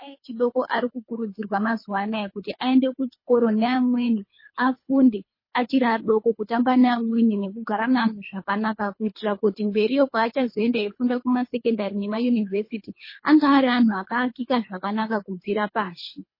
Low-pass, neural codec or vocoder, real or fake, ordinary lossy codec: 5.4 kHz; codec, 24 kHz, 3 kbps, HILCodec; fake; MP3, 24 kbps